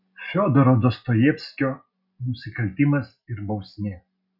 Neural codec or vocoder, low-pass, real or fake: none; 5.4 kHz; real